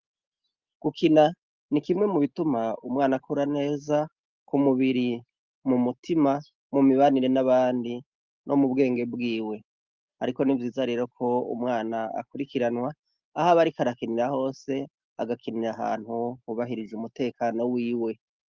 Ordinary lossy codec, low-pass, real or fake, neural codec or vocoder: Opus, 32 kbps; 7.2 kHz; real; none